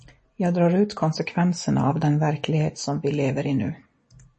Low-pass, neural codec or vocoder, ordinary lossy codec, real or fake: 10.8 kHz; none; MP3, 32 kbps; real